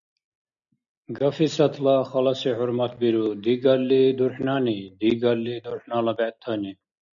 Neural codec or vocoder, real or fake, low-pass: none; real; 7.2 kHz